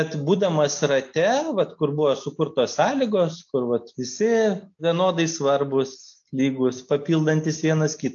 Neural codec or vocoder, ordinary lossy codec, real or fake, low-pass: none; AAC, 64 kbps; real; 7.2 kHz